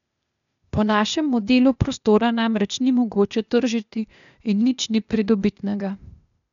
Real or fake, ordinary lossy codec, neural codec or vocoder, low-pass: fake; none; codec, 16 kHz, 0.8 kbps, ZipCodec; 7.2 kHz